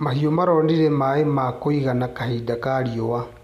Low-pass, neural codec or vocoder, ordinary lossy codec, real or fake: 14.4 kHz; none; none; real